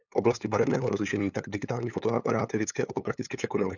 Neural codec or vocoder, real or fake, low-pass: codec, 16 kHz, 8 kbps, FunCodec, trained on LibriTTS, 25 frames a second; fake; 7.2 kHz